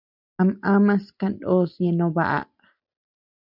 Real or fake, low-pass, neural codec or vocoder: real; 5.4 kHz; none